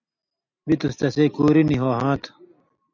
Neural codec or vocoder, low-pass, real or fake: none; 7.2 kHz; real